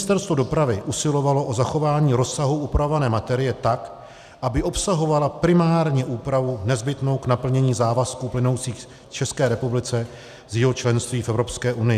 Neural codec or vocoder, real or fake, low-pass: none; real; 14.4 kHz